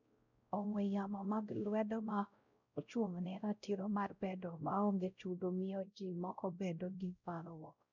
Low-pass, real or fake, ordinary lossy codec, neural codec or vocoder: 7.2 kHz; fake; none; codec, 16 kHz, 0.5 kbps, X-Codec, WavLM features, trained on Multilingual LibriSpeech